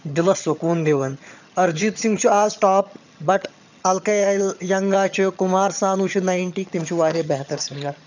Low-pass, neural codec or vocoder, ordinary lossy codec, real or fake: 7.2 kHz; vocoder, 22.05 kHz, 80 mel bands, HiFi-GAN; none; fake